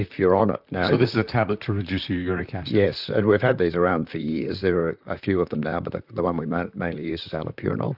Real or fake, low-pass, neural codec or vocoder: fake; 5.4 kHz; vocoder, 44.1 kHz, 128 mel bands, Pupu-Vocoder